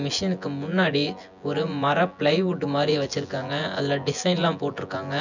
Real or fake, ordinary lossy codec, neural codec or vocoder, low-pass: fake; none; vocoder, 24 kHz, 100 mel bands, Vocos; 7.2 kHz